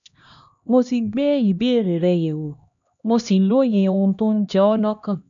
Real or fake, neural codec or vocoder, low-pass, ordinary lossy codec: fake; codec, 16 kHz, 1 kbps, X-Codec, HuBERT features, trained on LibriSpeech; 7.2 kHz; none